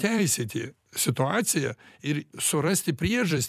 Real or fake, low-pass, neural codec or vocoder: fake; 14.4 kHz; vocoder, 44.1 kHz, 128 mel bands every 256 samples, BigVGAN v2